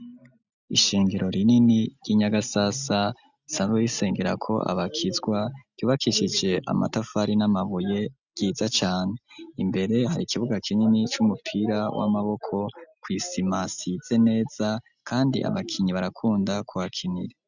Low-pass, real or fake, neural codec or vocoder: 7.2 kHz; real; none